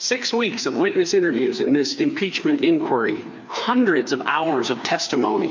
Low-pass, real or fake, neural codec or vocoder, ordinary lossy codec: 7.2 kHz; fake; codec, 16 kHz, 2 kbps, FreqCodec, larger model; MP3, 48 kbps